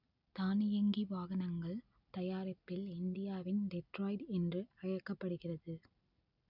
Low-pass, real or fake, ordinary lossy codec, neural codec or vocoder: 5.4 kHz; real; none; none